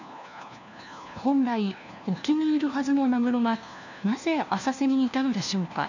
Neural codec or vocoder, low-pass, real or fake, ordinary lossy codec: codec, 16 kHz, 1 kbps, FunCodec, trained on LibriTTS, 50 frames a second; 7.2 kHz; fake; none